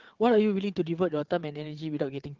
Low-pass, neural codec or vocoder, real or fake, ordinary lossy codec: 7.2 kHz; vocoder, 22.05 kHz, 80 mel bands, WaveNeXt; fake; Opus, 16 kbps